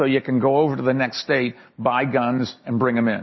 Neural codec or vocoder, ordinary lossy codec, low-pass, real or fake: none; MP3, 24 kbps; 7.2 kHz; real